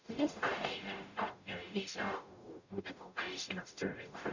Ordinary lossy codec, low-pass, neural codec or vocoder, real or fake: none; 7.2 kHz; codec, 44.1 kHz, 0.9 kbps, DAC; fake